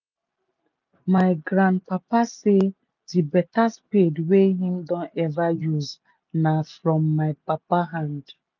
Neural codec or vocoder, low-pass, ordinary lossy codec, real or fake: none; 7.2 kHz; none; real